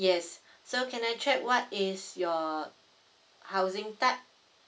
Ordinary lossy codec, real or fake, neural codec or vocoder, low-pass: none; real; none; none